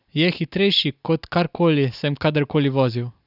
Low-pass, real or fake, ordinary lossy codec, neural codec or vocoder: 5.4 kHz; real; none; none